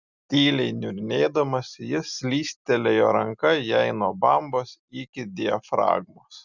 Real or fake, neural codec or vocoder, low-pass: real; none; 7.2 kHz